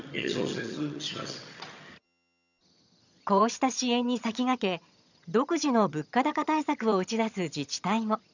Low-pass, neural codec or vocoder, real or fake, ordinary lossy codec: 7.2 kHz; vocoder, 22.05 kHz, 80 mel bands, HiFi-GAN; fake; none